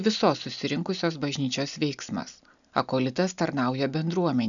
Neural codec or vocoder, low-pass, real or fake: none; 7.2 kHz; real